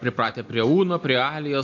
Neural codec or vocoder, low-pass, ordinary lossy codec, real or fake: none; 7.2 kHz; AAC, 48 kbps; real